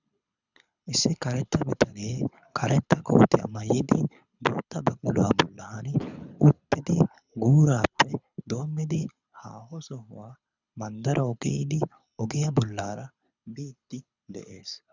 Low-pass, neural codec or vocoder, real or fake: 7.2 kHz; codec, 24 kHz, 6 kbps, HILCodec; fake